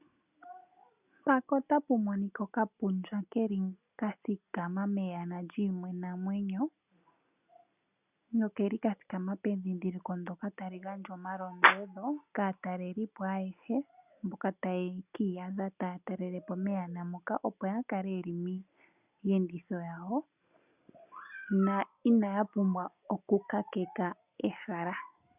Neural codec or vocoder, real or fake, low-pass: none; real; 3.6 kHz